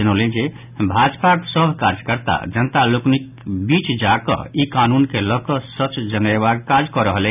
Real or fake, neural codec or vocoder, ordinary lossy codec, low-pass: real; none; none; 3.6 kHz